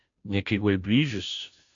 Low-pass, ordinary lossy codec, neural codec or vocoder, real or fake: 7.2 kHz; AAC, 64 kbps; codec, 16 kHz, 0.5 kbps, FunCodec, trained on Chinese and English, 25 frames a second; fake